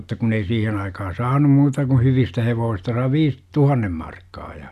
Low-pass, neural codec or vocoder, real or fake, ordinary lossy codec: 14.4 kHz; none; real; none